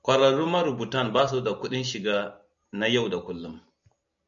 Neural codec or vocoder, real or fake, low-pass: none; real; 7.2 kHz